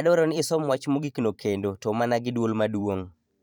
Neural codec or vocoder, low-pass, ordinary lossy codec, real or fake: vocoder, 44.1 kHz, 128 mel bands every 512 samples, BigVGAN v2; 19.8 kHz; none; fake